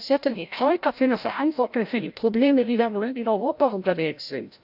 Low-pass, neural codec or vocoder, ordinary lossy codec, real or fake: 5.4 kHz; codec, 16 kHz, 0.5 kbps, FreqCodec, larger model; AAC, 48 kbps; fake